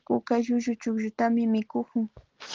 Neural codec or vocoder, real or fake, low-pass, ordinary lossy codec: none; real; 7.2 kHz; Opus, 16 kbps